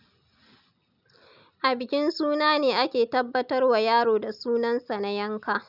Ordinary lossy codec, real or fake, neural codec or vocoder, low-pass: none; real; none; 5.4 kHz